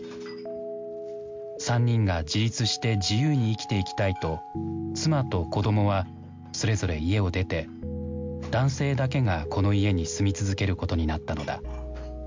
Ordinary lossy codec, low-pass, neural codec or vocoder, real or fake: MP3, 64 kbps; 7.2 kHz; none; real